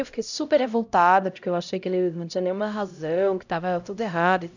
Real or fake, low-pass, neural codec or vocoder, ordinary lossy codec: fake; 7.2 kHz; codec, 16 kHz, 0.5 kbps, X-Codec, HuBERT features, trained on LibriSpeech; none